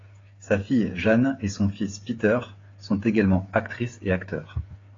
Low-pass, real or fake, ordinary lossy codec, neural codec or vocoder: 7.2 kHz; fake; AAC, 32 kbps; codec, 16 kHz, 8 kbps, FreqCodec, smaller model